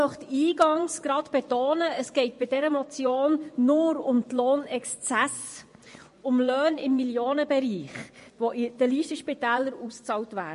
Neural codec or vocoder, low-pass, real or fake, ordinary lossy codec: vocoder, 24 kHz, 100 mel bands, Vocos; 10.8 kHz; fake; MP3, 48 kbps